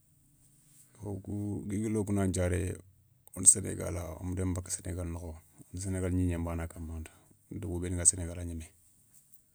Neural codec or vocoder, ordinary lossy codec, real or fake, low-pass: none; none; real; none